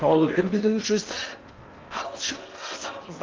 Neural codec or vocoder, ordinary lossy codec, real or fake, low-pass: codec, 16 kHz in and 24 kHz out, 0.6 kbps, FocalCodec, streaming, 4096 codes; Opus, 32 kbps; fake; 7.2 kHz